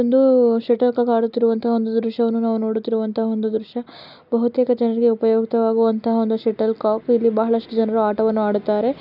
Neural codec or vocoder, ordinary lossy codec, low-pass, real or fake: none; none; 5.4 kHz; real